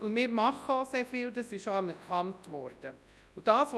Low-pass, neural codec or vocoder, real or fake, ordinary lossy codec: none; codec, 24 kHz, 0.9 kbps, WavTokenizer, large speech release; fake; none